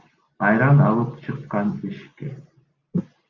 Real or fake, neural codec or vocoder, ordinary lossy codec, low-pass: real; none; Opus, 64 kbps; 7.2 kHz